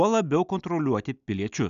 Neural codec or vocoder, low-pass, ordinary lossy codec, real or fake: none; 7.2 kHz; MP3, 96 kbps; real